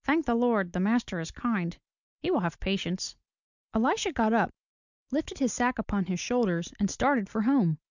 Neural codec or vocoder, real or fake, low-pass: none; real; 7.2 kHz